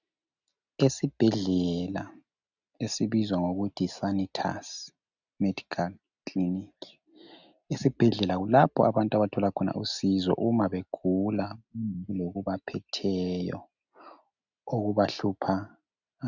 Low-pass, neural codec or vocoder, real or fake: 7.2 kHz; none; real